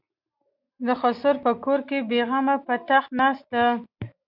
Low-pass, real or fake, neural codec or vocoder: 5.4 kHz; real; none